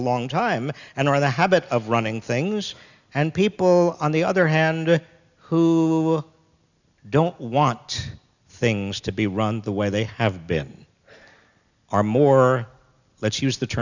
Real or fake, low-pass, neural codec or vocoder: real; 7.2 kHz; none